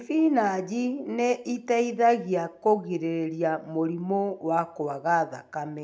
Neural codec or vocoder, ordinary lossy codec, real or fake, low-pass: none; none; real; none